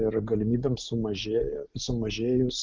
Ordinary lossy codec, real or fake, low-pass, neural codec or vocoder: Opus, 24 kbps; real; 7.2 kHz; none